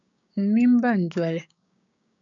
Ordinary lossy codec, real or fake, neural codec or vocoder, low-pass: MP3, 96 kbps; fake; codec, 16 kHz, 6 kbps, DAC; 7.2 kHz